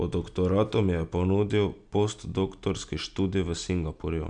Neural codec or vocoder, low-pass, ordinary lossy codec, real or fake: none; 9.9 kHz; none; real